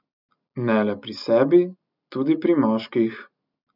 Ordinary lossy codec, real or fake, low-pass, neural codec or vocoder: none; real; 5.4 kHz; none